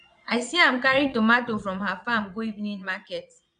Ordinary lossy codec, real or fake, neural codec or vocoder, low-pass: none; fake; vocoder, 22.05 kHz, 80 mel bands, Vocos; 9.9 kHz